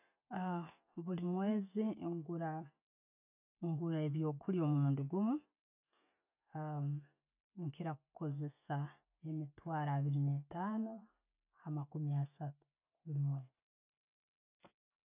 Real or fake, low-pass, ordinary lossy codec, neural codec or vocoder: real; 3.6 kHz; none; none